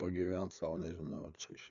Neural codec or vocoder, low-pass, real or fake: codec, 16 kHz, 4 kbps, FreqCodec, larger model; 7.2 kHz; fake